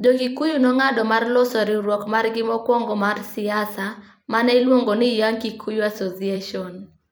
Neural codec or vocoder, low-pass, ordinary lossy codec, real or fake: vocoder, 44.1 kHz, 128 mel bands every 256 samples, BigVGAN v2; none; none; fake